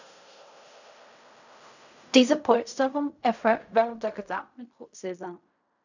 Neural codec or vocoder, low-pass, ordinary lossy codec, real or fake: codec, 16 kHz in and 24 kHz out, 0.4 kbps, LongCat-Audio-Codec, fine tuned four codebook decoder; 7.2 kHz; none; fake